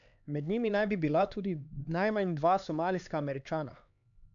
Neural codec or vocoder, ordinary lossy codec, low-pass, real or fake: codec, 16 kHz, 4 kbps, X-Codec, HuBERT features, trained on LibriSpeech; none; 7.2 kHz; fake